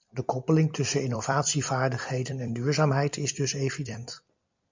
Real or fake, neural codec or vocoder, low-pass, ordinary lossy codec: fake; vocoder, 44.1 kHz, 128 mel bands every 256 samples, BigVGAN v2; 7.2 kHz; MP3, 64 kbps